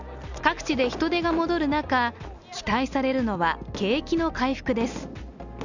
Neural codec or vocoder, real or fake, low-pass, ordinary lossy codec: none; real; 7.2 kHz; none